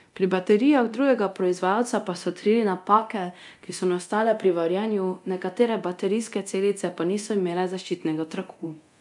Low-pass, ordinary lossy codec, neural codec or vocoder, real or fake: 10.8 kHz; none; codec, 24 kHz, 0.9 kbps, DualCodec; fake